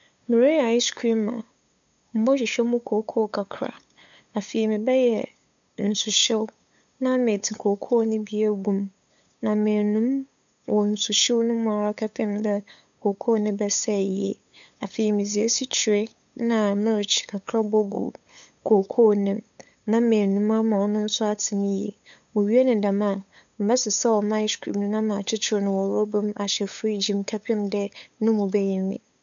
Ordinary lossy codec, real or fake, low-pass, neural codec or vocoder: none; fake; 7.2 kHz; codec, 16 kHz, 8 kbps, FunCodec, trained on LibriTTS, 25 frames a second